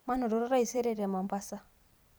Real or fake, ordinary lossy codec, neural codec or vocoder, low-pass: fake; none; vocoder, 44.1 kHz, 128 mel bands every 256 samples, BigVGAN v2; none